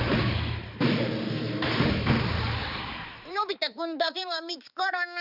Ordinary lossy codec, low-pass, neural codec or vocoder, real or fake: none; 5.4 kHz; codec, 16 kHz, 4 kbps, X-Codec, HuBERT features, trained on balanced general audio; fake